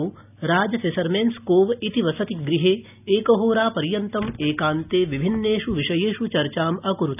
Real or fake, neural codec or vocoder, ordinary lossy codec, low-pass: real; none; none; 3.6 kHz